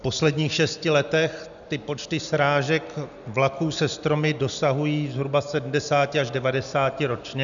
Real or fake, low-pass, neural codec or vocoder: real; 7.2 kHz; none